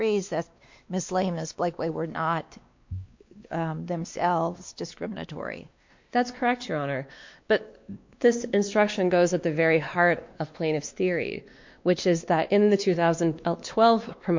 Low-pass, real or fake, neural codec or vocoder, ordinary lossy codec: 7.2 kHz; fake; codec, 16 kHz, 2 kbps, X-Codec, WavLM features, trained on Multilingual LibriSpeech; MP3, 48 kbps